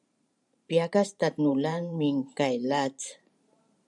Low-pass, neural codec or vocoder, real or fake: 10.8 kHz; vocoder, 44.1 kHz, 128 mel bands every 512 samples, BigVGAN v2; fake